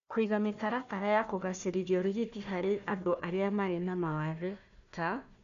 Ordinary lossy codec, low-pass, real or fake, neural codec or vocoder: none; 7.2 kHz; fake; codec, 16 kHz, 1 kbps, FunCodec, trained on Chinese and English, 50 frames a second